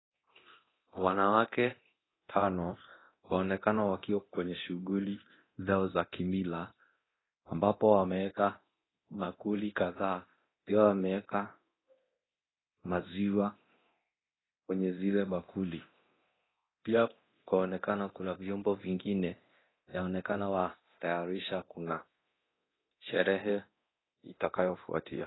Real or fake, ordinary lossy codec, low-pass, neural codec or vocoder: fake; AAC, 16 kbps; 7.2 kHz; codec, 24 kHz, 0.9 kbps, DualCodec